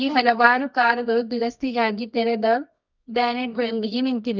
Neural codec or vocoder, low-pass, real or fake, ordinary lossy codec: codec, 24 kHz, 0.9 kbps, WavTokenizer, medium music audio release; 7.2 kHz; fake; none